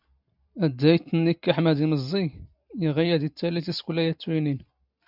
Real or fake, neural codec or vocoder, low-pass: real; none; 5.4 kHz